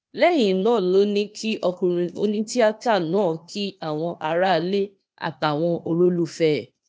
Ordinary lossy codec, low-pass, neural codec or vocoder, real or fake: none; none; codec, 16 kHz, 0.8 kbps, ZipCodec; fake